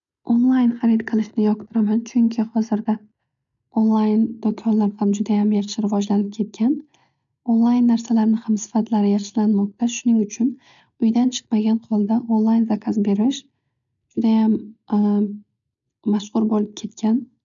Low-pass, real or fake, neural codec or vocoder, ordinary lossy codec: 7.2 kHz; real; none; none